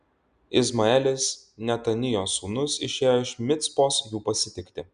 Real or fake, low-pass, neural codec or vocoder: real; 9.9 kHz; none